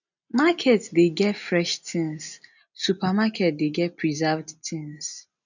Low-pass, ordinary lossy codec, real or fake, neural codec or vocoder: 7.2 kHz; none; real; none